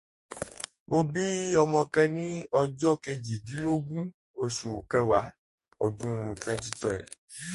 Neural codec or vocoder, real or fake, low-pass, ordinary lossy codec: codec, 44.1 kHz, 2.6 kbps, DAC; fake; 14.4 kHz; MP3, 48 kbps